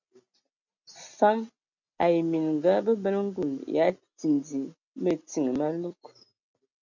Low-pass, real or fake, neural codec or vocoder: 7.2 kHz; real; none